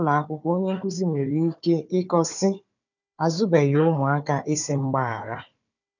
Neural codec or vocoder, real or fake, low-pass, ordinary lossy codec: codec, 16 kHz, 4 kbps, FunCodec, trained on Chinese and English, 50 frames a second; fake; 7.2 kHz; none